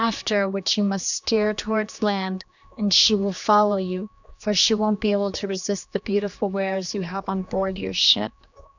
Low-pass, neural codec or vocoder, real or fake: 7.2 kHz; codec, 16 kHz, 2 kbps, X-Codec, HuBERT features, trained on general audio; fake